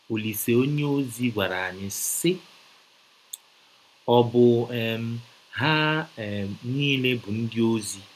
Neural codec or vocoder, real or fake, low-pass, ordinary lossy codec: none; real; 14.4 kHz; none